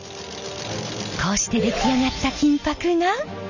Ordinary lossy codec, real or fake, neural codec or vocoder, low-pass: none; real; none; 7.2 kHz